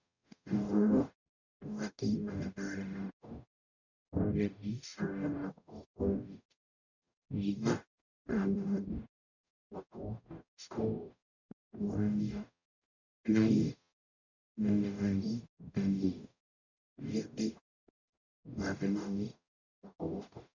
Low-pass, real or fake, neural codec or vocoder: 7.2 kHz; fake; codec, 44.1 kHz, 0.9 kbps, DAC